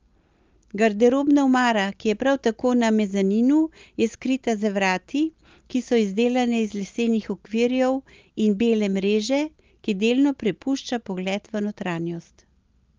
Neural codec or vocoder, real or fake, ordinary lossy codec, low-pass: none; real; Opus, 32 kbps; 7.2 kHz